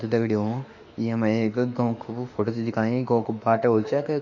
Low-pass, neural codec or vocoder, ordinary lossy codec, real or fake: 7.2 kHz; autoencoder, 48 kHz, 32 numbers a frame, DAC-VAE, trained on Japanese speech; none; fake